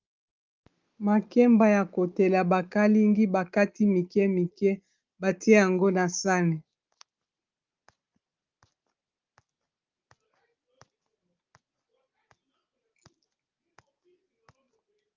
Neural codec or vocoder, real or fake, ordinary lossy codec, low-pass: none; real; Opus, 32 kbps; 7.2 kHz